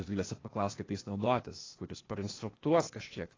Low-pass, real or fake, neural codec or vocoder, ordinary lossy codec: 7.2 kHz; fake; codec, 16 kHz, 0.8 kbps, ZipCodec; AAC, 32 kbps